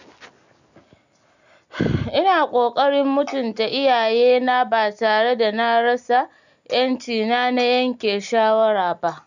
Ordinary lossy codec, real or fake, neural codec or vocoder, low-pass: none; real; none; 7.2 kHz